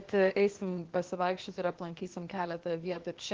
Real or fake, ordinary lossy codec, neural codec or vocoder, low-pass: fake; Opus, 16 kbps; codec, 16 kHz, 0.8 kbps, ZipCodec; 7.2 kHz